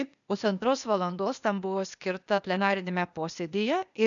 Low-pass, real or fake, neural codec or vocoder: 7.2 kHz; fake; codec, 16 kHz, 0.8 kbps, ZipCodec